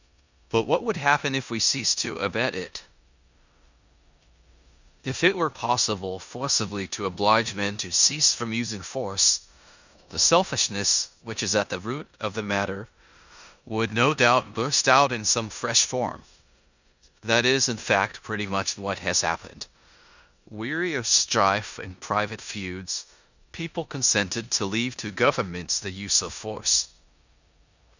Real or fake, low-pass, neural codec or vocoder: fake; 7.2 kHz; codec, 16 kHz in and 24 kHz out, 0.9 kbps, LongCat-Audio-Codec, four codebook decoder